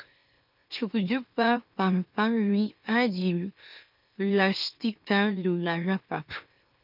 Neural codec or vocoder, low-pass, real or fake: autoencoder, 44.1 kHz, a latent of 192 numbers a frame, MeloTTS; 5.4 kHz; fake